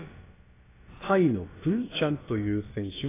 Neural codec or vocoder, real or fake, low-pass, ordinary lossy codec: codec, 16 kHz, about 1 kbps, DyCAST, with the encoder's durations; fake; 3.6 kHz; AAC, 16 kbps